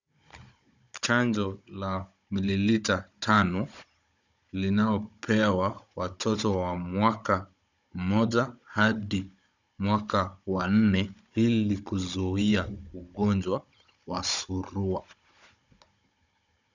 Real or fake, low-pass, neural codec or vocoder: fake; 7.2 kHz; codec, 16 kHz, 16 kbps, FunCodec, trained on Chinese and English, 50 frames a second